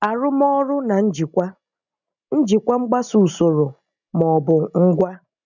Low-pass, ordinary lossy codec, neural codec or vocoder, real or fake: 7.2 kHz; none; none; real